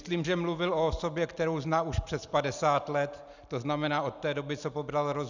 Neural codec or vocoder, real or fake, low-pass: none; real; 7.2 kHz